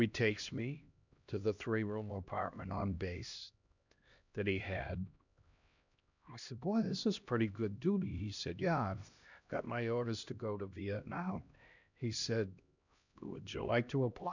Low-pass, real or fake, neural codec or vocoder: 7.2 kHz; fake; codec, 16 kHz, 1 kbps, X-Codec, HuBERT features, trained on LibriSpeech